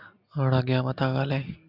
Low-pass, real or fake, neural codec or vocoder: 5.4 kHz; real; none